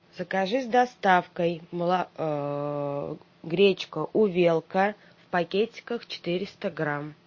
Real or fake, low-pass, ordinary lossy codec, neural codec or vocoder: real; 7.2 kHz; MP3, 32 kbps; none